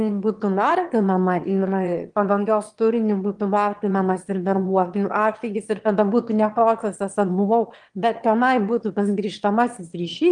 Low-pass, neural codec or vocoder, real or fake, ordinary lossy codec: 9.9 kHz; autoencoder, 22.05 kHz, a latent of 192 numbers a frame, VITS, trained on one speaker; fake; Opus, 24 kbps